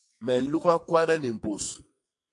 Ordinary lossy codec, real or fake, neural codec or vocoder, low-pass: MP3, 64 kbps; fake; codec, 44.1 kHz, 2.6 kbps, SNAC; 10.8 kHz